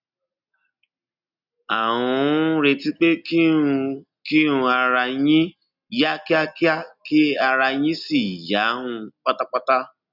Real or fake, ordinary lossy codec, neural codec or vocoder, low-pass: real; none; none; 5.4 kHz